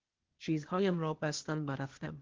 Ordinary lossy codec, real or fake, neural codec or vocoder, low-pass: Opus, 16 kbps; fake; codec, 16 kHz, 0.8 kbps, ZipCodec; 7.2 kHz